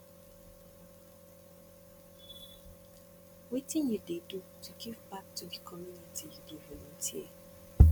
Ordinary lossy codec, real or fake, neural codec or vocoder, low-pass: none; real; none; none